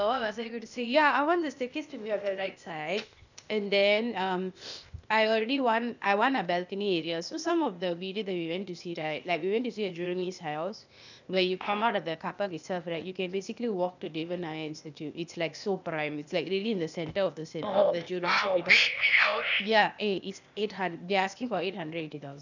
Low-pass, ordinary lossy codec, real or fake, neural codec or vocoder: 7.2 kHz; none; fake; codec, 16 kHz, 0.8 kbps, ZipCodec